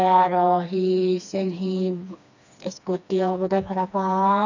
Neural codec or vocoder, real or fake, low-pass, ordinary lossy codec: codec, 16 kHz, 2 kbps, FreqCodec, smaller model; fake; 7.2 kHz; none